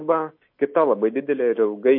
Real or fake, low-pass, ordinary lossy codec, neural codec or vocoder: real; 5.4 kHz; MP3, 32 kbps; none